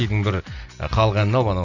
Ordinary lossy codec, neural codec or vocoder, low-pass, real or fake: none; none; 7.2 kHz; real